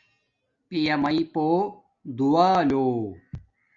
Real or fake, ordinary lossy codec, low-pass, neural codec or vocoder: real; Opus, 64 kbps; 7.2 kHz; none